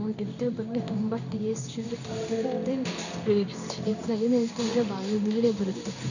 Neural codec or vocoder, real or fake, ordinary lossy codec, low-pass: codec, 16 kHz in and 24 kHz out, 1 kbps, XY-Tokenizer; fake; none; 7.2 kHz